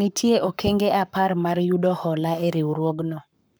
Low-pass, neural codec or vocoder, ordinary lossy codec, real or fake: none; codec, 44.1 kHz, 7.8 kbps, Pupu-Codec; none; fake